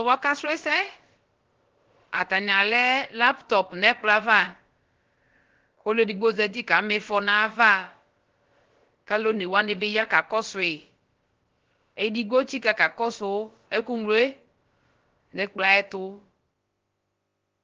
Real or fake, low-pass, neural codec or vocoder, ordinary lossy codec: fake; 7.2 kHz; codec, 16 kHz, about 1 kbps, DyCAST, with the encoder's durations; Opus, 16 kbps